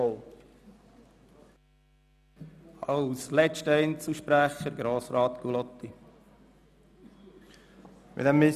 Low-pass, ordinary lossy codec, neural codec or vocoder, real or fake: 14.4 kHz; none; none; real